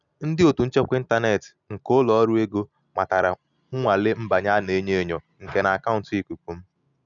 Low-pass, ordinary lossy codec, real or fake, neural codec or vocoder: 7.2 kHz; none; real; none